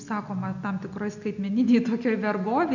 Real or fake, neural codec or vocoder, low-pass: real; none; 7.2 kHz